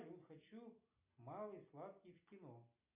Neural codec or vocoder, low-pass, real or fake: none; 3.6 kHz; real